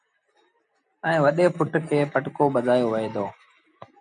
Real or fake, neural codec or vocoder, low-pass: real; none; 9.9 kHz